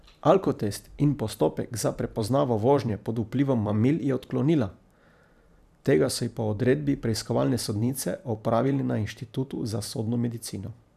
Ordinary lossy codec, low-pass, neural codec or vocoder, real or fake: none; 14.4 kHz; vocoder, 44.1 kHz, 128 mel bands every 512 samples, BigVGAN v2; fake